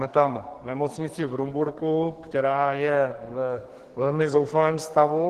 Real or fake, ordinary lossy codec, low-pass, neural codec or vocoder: fake; Opus, 16 kbps; 14.4 kHz; codec, 44.1 kHz, 2.6 kbps, SNAC